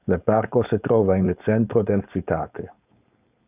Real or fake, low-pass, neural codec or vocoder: fake; 3.6 kHz; codec, 16 kHz, 4.8 kbps, FACodec